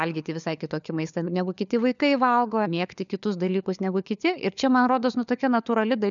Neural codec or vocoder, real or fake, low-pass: codec, 16 kHz, 4 kbps, FunCodec, trained on LibriTTS, 50 frames a second; fake; 7.2 kHz